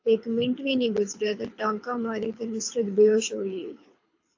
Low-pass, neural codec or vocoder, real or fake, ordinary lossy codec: 7.2 kHz; codec, 24 kHz, 6 kbps, HILCodec; fake; AAC, 48 kbps